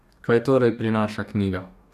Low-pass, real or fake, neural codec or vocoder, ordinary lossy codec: 14.4 kHz; fake; codec, 44.1 kHz, 2.6 kbps, DAC; none